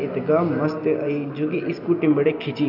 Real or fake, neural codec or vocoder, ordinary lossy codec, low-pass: real; none; none; 5.4 kHz